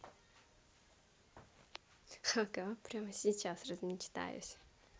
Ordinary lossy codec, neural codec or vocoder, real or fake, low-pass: none; none; real; none